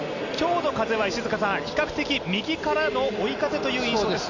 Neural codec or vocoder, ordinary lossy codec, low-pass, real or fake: none; none; 7.2 kHz; real